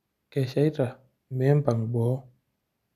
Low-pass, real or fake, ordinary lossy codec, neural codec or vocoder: 14.4 kHz; real; none; none